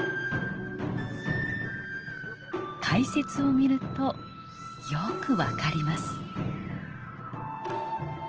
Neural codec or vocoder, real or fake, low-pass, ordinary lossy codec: none; real; 7.2 kHz; Opus, 16 kbps